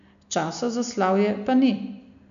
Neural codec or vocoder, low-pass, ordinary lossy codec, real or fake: none; 7.2 kHz; none; real